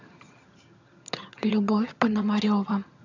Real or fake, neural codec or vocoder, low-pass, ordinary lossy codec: fake; vocoder, 22.05 kHz, 80 mel bands, HiFi-GAN; 7.2 kHz; AAC, 48 kbps